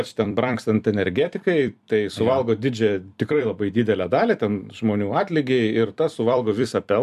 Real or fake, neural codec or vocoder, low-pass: fake; vocoder, 44.1 kHz, 128 mel bands every 256 samples, BigVGAN v2; 14.4 kHz